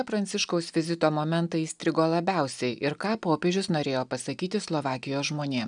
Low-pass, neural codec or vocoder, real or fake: 9.9 kHz; none; real